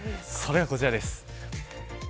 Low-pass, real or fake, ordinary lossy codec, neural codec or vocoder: none; real; none; none